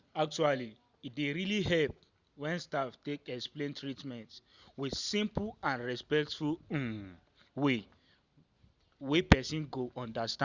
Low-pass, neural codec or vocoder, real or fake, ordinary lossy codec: 7.2 kHz; none; real; Opus, 64 kbps